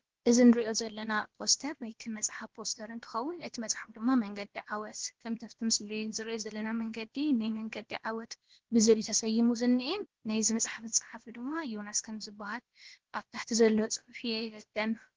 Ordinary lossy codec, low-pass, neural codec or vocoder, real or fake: Opus, 16 kbps; 7.2 kHz; codec, 16 kHz, about 1 kbps, DyCAST, with the encoder's durations; fake